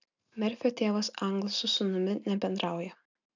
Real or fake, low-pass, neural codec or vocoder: real; 7.2 kHz; none